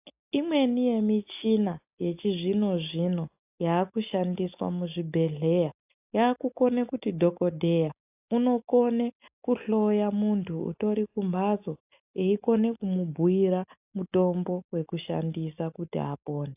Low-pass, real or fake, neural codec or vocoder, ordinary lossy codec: 3.6 kHz; real; none; AAC, 32 kbps